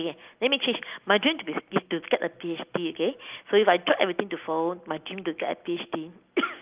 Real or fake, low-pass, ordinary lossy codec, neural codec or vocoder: real; 3.6 kHz; Opus, 24 kbps; none